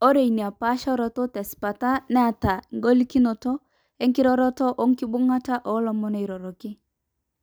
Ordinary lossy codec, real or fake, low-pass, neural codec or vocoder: none; real; none; none